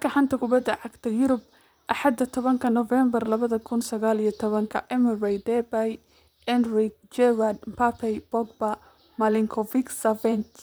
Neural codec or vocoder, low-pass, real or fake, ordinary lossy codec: vocoder, 44.1 kHz, 128 mel bands, Pupu-Vocoder; none; fake; none